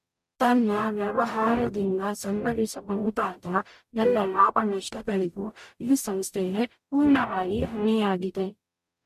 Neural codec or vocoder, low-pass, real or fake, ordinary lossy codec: codec, 44.1 kHz, 0.9 kbps, DAC; 14.4 kHz; fake; MP3, 64 kbps